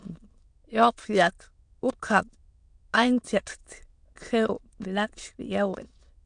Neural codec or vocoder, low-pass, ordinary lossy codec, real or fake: autoencoder, 22.05 kHz, a latent of 192 numbers a frame, VITS, trained on many speakers; 9.9 kHz; MP3, 64 kbps; fake